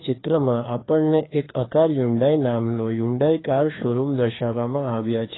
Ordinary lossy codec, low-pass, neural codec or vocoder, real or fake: AAC, 16 kbps; 7.2 kHz; codec, 16 kHz, 2 kbps, FreqCodec, larger model; fake